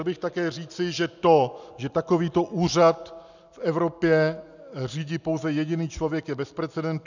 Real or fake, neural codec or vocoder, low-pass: real; none; 7.2 kHz